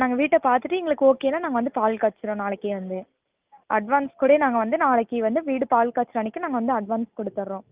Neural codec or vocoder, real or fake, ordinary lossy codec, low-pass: none; real; Opus, 24 kbps; 3.6 kHz